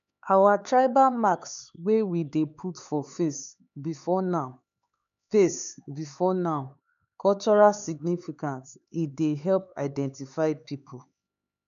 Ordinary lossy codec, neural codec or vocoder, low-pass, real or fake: none; codec, 16 kHz, 4 kbps, X-Codec, HuBERT features, trained on LibriSpeech; 7.2 kHz; fake